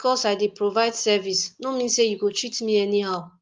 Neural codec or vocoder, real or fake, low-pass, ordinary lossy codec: none; real; 7.2 kHz; Opus, 24 kbps